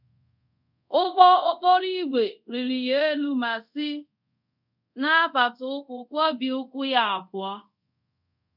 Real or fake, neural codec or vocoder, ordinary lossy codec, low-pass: fake; codec, 24 kHz, 0.5 kbps, DualCodec; none; 5.4 kHz